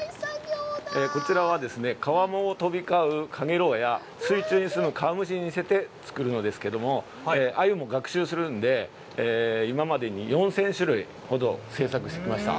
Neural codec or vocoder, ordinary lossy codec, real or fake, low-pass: none; none; real; none